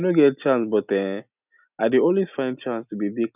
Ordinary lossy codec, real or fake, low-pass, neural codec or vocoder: none; real; 3.6 kHz; none